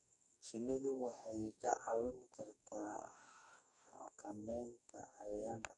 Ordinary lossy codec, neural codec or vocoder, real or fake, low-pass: none; codec, 44.1 kHz, 2.6 kbps, DAC; fake; 10.8 kHz